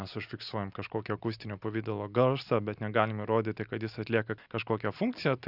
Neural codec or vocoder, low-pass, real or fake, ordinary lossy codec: none; 5.4 kHz; real; Opus, 64 kbps